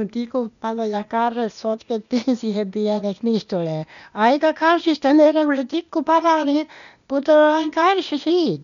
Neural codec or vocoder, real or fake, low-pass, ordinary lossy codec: codec, 16 kHz, 0.8 kbps, ZipCodec; fake; 7.2 kHz; none